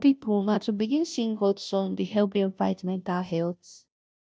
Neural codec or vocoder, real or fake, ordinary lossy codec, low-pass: codec, 16 kHz, 0.5 kbps, FunCodec, trained on Chinese and English, 25 frames a second; fake; none; none